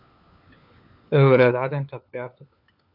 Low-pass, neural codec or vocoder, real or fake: 5.4 kHz; codec, 16 kHz, 4 kbps, FunCodec, trained on LibriTTS, 50 frames a second; fake